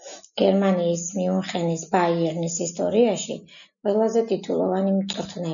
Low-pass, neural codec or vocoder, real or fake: 7.2 kHz; none; real